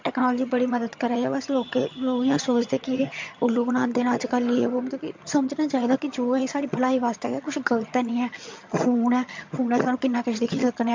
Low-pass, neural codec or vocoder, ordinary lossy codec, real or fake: 7.2 kHz; vocoder, 22.05 kHz, 80 mel bands, HiFi-GAN; MP3, 48 kbps; fake